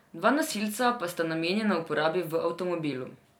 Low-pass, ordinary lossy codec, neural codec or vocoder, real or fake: none; none; none; real